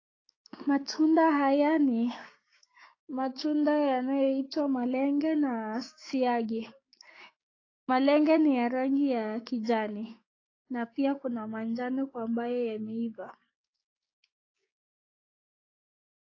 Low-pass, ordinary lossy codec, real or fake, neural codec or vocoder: 7.2 kHz; AAC, 32 kbps; fake; codec, 16 kHz, 6 kbps, DAC